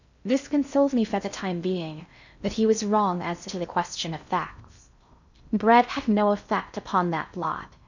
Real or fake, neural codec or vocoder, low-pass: fake; codec, 16 kHz in and 24 kHz out, 0.6 kbps, FocalCodec, streaming, 2048 codes; 7.2 kHz